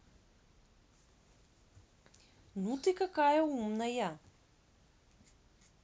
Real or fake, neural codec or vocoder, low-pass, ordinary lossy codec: real; none; none; none